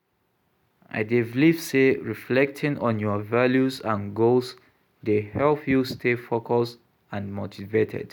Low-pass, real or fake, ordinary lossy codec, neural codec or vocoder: 19.8 kHz; real; none; none